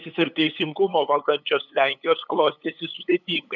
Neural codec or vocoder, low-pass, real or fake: codec, 16 kHz, 8 kbps, FunCodec, trained on LibriTTS, 25 frames a second; 7.2 kHz; fake